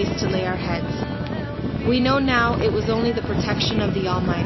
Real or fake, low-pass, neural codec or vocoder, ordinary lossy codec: real; 7.2 kHz; none; MP3, 24 kbps